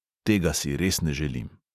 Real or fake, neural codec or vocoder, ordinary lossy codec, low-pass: real; none; none; 14.4 kHz